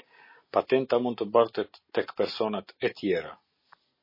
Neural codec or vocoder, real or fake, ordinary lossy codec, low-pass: none; real; MP3, 24 kbps; 5.4 kHz